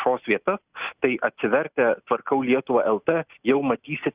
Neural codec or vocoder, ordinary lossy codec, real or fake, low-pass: none; Opus, 64 kbps; real; 3.6 kHz